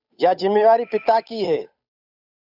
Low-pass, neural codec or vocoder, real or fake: 5.4 kHz; codec, 16 kHz, 8 kbps, FunCodec, trained on Chinese and English, 25 frames a second; fake